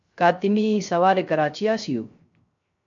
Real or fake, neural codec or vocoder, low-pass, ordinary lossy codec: fake; codec, 16 kHz, 0.3 kbps, FocalCodec; 7.2 kHz; MP3, 48 kbps